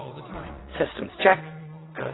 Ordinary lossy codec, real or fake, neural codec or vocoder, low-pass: AAC, 16 kbps; fake; vocoder, 22.05 kHz, 80 mel bands, WaveNeXt; 7.2 kHz